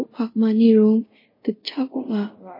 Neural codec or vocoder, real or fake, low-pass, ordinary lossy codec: codec, 24 kHz, 0.5 kbps, DualCodec; fake; 5.4 kHz; MP3, 24 kbps